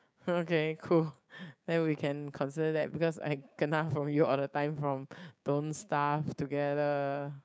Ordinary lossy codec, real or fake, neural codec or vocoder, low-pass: none; fake; codec, 16 kHz, 6 kbps, DAC; none